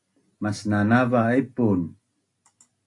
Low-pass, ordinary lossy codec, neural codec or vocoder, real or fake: 10.8 kHz; AAC, 48 kbps; none; real